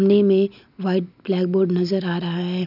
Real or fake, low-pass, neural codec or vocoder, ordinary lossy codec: real; 5.4 kHz; none; none